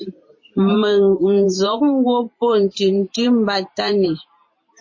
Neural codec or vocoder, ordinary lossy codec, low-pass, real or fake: vocoder, 44.1 kHz, 128 mel bands every 512 samples, BigVGAN v2; MP3, 32 kbps; 7.2 kHz; fake